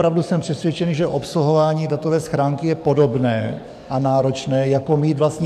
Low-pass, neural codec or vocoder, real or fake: 14.4 kHz; codec, 44.1 kHz, 7.8 kbps, DAC; fake